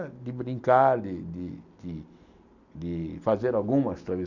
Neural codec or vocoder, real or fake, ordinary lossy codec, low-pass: none; real; none; 7.2 kHz